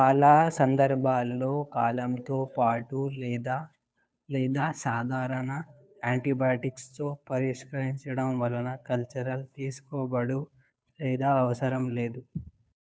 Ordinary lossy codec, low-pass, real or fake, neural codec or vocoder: none; none; fake; codec, 16 kHz, 2 kbps, FunCodec, trained on Chinese and English, 25 frames a second